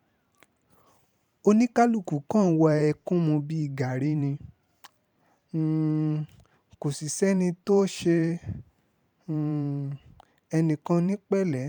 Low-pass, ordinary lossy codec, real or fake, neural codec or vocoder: 19.8 kHz; none; fake; vocoder, 44.1 kHz, 128 mel bands every 512 samples, BigVGAN v2